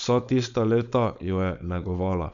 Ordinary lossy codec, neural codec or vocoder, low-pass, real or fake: none; codec, 16 kHz, 4.8 kbps, FACodec; 7.2 kHz; fake